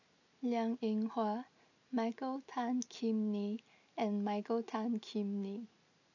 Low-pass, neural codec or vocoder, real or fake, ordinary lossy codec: 7.2 kHz; none; real; none